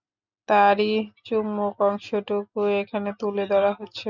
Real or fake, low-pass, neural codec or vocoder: real; 7.2 kHz; none